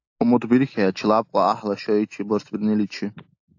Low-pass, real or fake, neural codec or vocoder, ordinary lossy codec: 7.2 kHz; real; none; AAC, 48 kbps